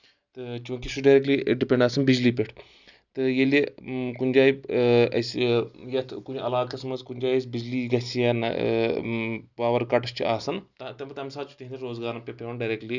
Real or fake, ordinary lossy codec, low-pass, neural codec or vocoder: real; none; 7.2 kHz; none